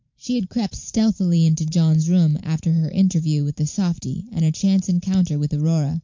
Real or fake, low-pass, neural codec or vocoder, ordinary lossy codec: fake; 7.2 kHz; vocoder, 44.1 kHz, 128 mel bands every 512 samples, BigVGAN v2; MP3, 64 kbps